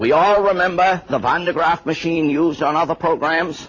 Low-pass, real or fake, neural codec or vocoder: 7.2 kHz; real; none